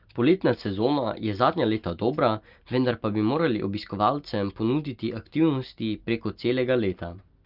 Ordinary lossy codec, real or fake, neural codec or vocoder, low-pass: Opus, 24 kbps; real; none; 5.4 kHz